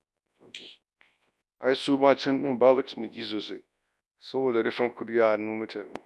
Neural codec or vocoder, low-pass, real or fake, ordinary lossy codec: codec, 24 kHz, 0.9 kbps, WavTokenizer, large speech release; none; fake; none